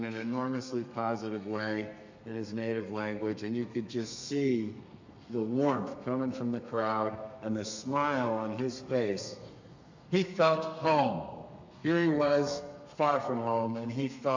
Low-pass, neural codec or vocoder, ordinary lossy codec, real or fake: 7.2 kHz; codec, 32 kHz, 1.9 kbps, SNAC; MP3, 64 kbps; fake